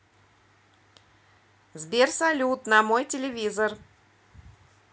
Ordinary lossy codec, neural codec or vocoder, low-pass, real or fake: none; none; none; real